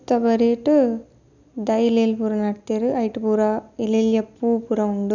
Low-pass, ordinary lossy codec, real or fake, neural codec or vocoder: 7.2 kHz; none; real; none